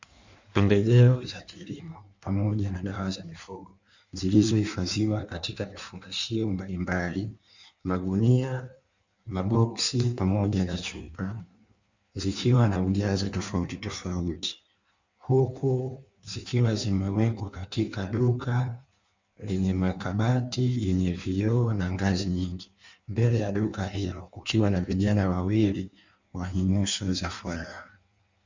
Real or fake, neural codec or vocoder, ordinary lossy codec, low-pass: fake; codec, 16 kHz in and 24 kHz out, 1.1 kbps, FireRedTTS-2 codec; Opus, 64 kbps; 7.2 kHz